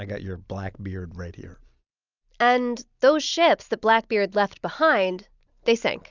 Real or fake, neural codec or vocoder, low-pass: real; none; 7.2 kHz